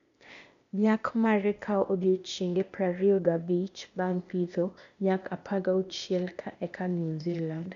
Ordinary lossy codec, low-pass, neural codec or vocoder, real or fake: AAC, 96 kbps; 7.2 kHz; codec, 16 kHz, 0.8 kbps, ZipCodec; fake